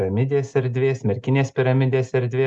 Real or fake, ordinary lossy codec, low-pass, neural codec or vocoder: real; Opus, 64 kbps; 10.8 kHz; none